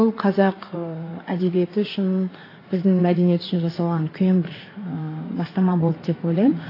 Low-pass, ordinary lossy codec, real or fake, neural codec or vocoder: 5.4 kHz; AAC, 24 kbps; fake; codec, 16 kHz in and 24 kHz out, 2.2 kbps, FireRedTTS-2 codec